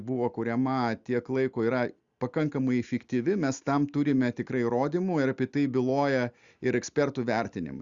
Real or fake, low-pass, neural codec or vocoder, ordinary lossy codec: real; 7.2 kHz; none; Opus, 64 kbps